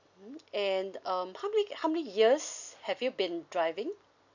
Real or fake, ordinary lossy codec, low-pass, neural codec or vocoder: real; none; 7.2 kHz; none